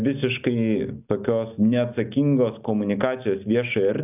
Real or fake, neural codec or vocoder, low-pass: real; none; 3.6 kHz